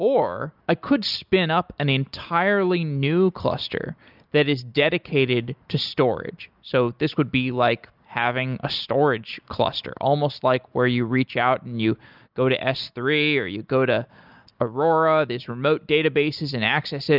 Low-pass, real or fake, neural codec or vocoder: 5.4 kHz; real; none